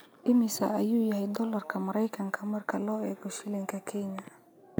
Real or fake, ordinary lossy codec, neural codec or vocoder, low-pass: real; none; none; none